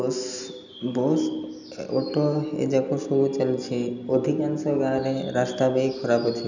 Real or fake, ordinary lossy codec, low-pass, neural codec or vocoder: real; none; 7.2 kHz; none